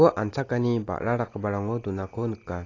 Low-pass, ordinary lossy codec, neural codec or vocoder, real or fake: 7.2 kHz; AAC, 32 kbps; none; real